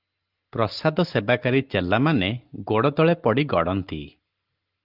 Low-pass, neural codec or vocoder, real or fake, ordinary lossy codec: 5.4 kHz; none; real; Opus, 32 kbps